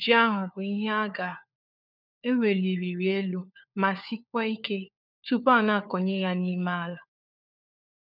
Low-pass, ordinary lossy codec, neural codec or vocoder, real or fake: 5.4 kHz; none; codec, 16 kHz, 4 kbps, FunCodec, trained on LibriTTS, 50 frames a second; fake